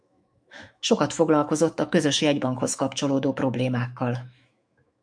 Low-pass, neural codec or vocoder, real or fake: 9.9 kHz; autoencoder, 48 kHz, 128 numbers a frame, DAC-VAE, trained on Japanese speech; fake